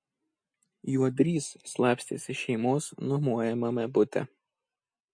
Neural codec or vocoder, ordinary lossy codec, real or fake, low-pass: none; MP3, 48 kbps; real; 9.9 kHz